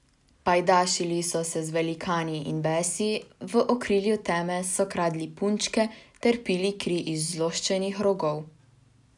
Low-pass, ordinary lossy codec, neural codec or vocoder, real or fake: 10.8 kHz; none; none; real